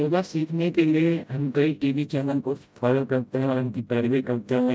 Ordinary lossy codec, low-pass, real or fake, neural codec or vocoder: none; none; fake; codec, 16 kHz, 0.5 kbps, FreqCodec, smaller model